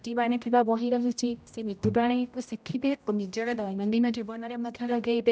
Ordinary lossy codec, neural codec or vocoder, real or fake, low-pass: none; codec, 16 kHz, 0.5 kbps, X-Codec, HuBERT features, trained on general audio; fake; none